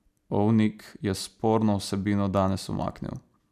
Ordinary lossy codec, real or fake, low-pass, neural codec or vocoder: none; fake; 14.4 kHz; vocoder, 44.1 kHz, 128 mel bands every 512 samples, BigVGAN v2